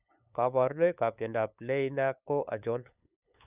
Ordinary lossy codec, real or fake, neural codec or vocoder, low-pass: none; fake; codec, 16 kHz, 8 kbps, FunCodec, trained on LibriTTS, 25 frames a second; 3.6 kHz